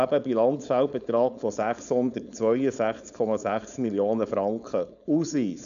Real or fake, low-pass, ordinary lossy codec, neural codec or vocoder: fake; 7.2 kHz; none; codec, 16 kHz, 4.8 kbps, FACodec